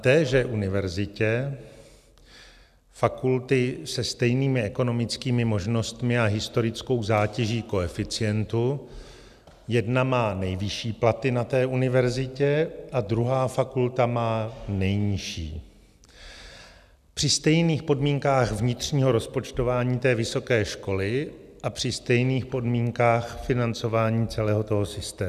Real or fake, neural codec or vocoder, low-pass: real; none; 14.4 kHz